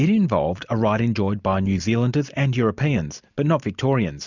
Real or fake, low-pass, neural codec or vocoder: real; 7.2 kHz; none